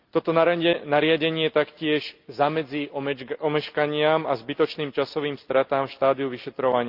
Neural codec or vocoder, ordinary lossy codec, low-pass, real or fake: none; Opus, 32 kbps; 5.4 kHz; real